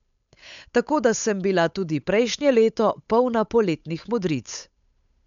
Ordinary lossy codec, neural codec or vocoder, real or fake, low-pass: none; codec, 16 kHz, 8 kbps, FunCodec, trained on Chinese and English, 25 frames a second; fake; 7.2 kHz